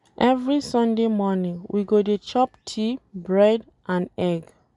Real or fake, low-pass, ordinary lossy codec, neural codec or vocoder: real; 10.8 kHz; none; none